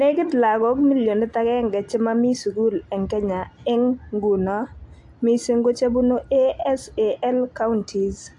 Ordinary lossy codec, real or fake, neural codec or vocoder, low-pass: AAC, 64 kbps; real; none; 10.8 kHz